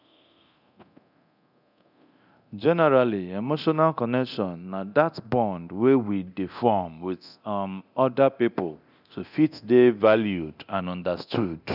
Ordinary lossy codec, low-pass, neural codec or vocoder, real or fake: none; 5.4 kHz; codec, 24 kHz, 0.9 kbps, DualCodec; fake